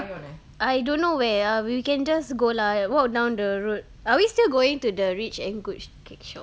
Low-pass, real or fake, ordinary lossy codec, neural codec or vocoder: none; real; none; none